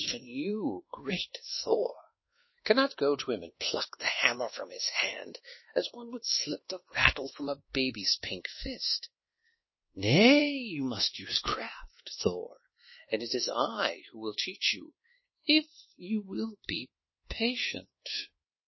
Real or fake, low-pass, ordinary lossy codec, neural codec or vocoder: fake; 7.2 kHz; MP3, 24 kbps; codec, 24 kHz, 1.2 kbps, DualCodec